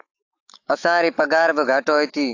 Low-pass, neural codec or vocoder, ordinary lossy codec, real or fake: 7.2 kHz; autoencoder, 48 kHz, 128 numbers a frame, DAC-VAE, trained on Japanese speech; AAC, 48 kbps; fake